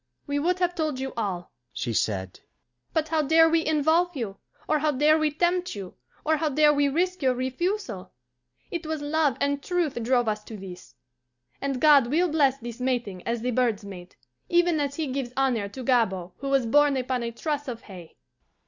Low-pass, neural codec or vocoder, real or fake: 7.2 kHz; none; real